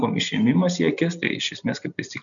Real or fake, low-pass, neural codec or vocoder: real; 7.2 kHz; none